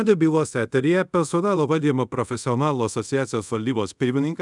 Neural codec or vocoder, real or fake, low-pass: codec, 24 kHz, 0.5 kbps, DualCodec; fake; 10.8 kHz